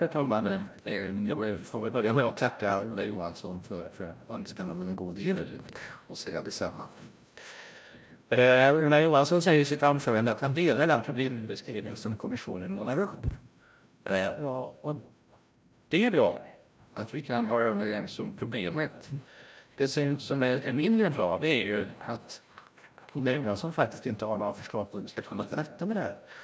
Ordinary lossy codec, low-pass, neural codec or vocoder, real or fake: none; none; codec, 16 kHz, 0.5 kbps, FreqCodec, larger model; fake